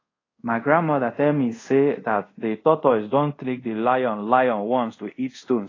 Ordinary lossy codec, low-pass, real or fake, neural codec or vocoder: AAC, 32 kbps; 7.2 kHz; fake; codec, 24 kHz, 0.5 kbps, DualCodec